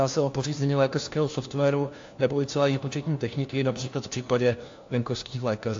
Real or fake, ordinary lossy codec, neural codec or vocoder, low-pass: fake; AAC, 48 kbps; codec, 16 kHz, 1 kbps, FunCodec, trained on LibriTTS, 50 frames a second; 7.2 kHz